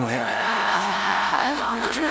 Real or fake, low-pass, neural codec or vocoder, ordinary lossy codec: fake; none; codec, 16 kHz, 0.5 kbps, FunCodec, trained on LibriTTS, 25 frames a second; none